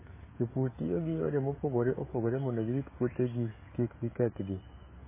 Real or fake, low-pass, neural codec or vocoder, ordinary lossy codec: fake; 3.6 kHz; codec, 16 kHz, 16 kbps, FreqCodec, smaller model; MP3, 16 kbps